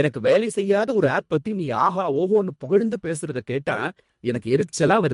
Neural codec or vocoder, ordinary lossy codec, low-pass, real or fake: codec, 24 kHz, 1.5 kbps, HILCodec; MP3, 64 kbps; 10.8 kHz; fake